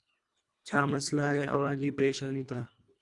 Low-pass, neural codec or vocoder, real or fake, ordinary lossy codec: 10.8 kHz; codec, 24 kHz, 1.5 kbps, HILCodec; fake; Opus, 64 kbps